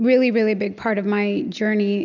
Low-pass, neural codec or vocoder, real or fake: 7.2 kHz; none; real